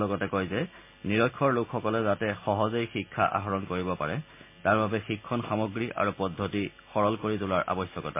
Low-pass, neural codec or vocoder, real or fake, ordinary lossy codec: 3.6 kHz; none; real; MP3, 24 kbps